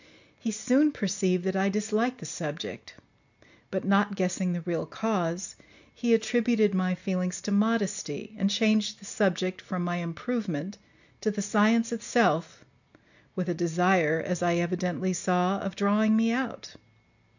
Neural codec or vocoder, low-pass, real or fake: none; 7.2 kHz; real